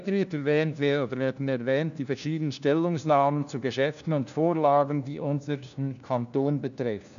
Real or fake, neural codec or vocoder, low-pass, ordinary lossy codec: fake; codec, 16 kHz, 1 kbps, FunCodec, trained on LibriTTS, 50 frames a second; 7.2 kHz; none